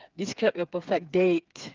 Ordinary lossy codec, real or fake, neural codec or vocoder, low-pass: Opus, 32 kbps; fake; codec, 16 kHz, 4 kbps, FreqCodec, smaller model; 7.2 kHz